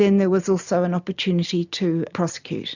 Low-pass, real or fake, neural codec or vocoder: 7.2 kHz; real; none